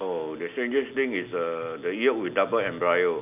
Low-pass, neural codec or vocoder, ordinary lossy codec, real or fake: 3.6 kHz; none; none; real